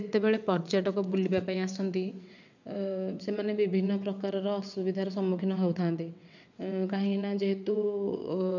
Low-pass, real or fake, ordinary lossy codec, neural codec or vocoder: 7.2 kHz; fake; none; vocoder, 22.05 kHz, 80 mel bands, WaveNeXt